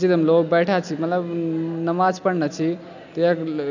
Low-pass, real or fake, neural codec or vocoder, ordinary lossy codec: 7.2 kHz; real; none; none